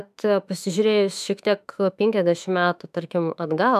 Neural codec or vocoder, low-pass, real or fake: autoencoder, 48 kHz, 32 numbers a frame, DAC-VAE, trained on Japanese speech; 14.4 kHz; fake